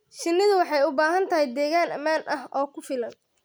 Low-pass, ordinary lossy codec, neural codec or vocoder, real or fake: none; none; none; real